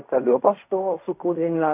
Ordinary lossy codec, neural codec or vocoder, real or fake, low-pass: AAC, 32 kbps; codec, 16 kHz in and 24 kHz out, 0.4 kbps, LongCat-Audio-Codec, fine tuned four codebook decoder; fake; 3.6 kHz